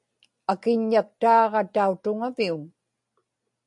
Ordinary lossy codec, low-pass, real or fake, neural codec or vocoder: MP3, 64 kbps; 10.8 kHz; real; none